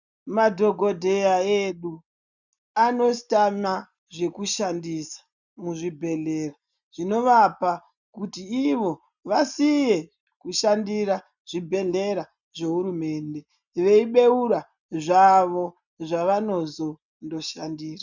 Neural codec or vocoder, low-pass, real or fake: none; 7.2 kHz; real